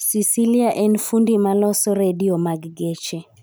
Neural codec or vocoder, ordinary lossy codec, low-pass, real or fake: none; none; none; real